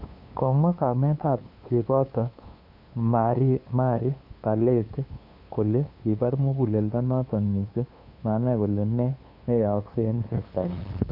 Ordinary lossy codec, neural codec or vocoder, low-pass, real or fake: none; codec, 16 kHz, 2 kbps, FunCodec, trained on LibriTTS, 25 frames a second; 5.4 kHz; fake